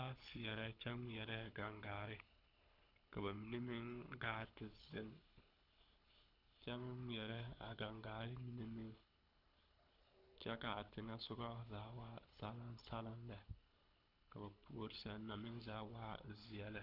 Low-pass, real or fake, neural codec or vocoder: 5.4 kHz; fake; codec, 24 kHz, 6 kbps, HILCodec